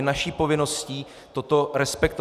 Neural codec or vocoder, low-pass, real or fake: none; 14.4 kHz; real